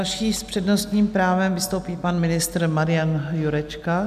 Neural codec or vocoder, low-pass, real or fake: none; 14.4 kHz; real